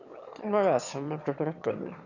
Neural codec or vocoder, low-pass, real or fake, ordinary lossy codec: autoencoder, 22.05 kHz, a latent of 192 numbers a frame, VITS, trained on one speaker; 7.2 kHz; fake; none